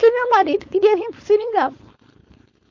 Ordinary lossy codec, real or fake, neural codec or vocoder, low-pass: MP3, 48 kbps; fake; codec, 16 kHz, 4.8 kbps, FACodec; 7.2 kHz